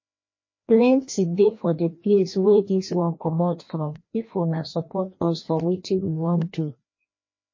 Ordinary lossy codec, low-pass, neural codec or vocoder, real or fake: MP3, 32 kbps; 7.2 kHz; codec, 16 kHz, 1 kbps, FreqCodec, larger model; fake